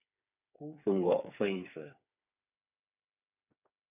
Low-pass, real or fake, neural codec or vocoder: 3.6 kHz; fake; codec, 16 kHz, 4 kbps, FreqCodec, smaller model